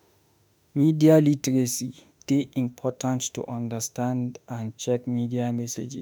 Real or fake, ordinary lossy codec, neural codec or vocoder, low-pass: fake; none; autoencoder, 48 kHz, 32 numbers a frame, DAC-VAE, trained on Japanese speech; none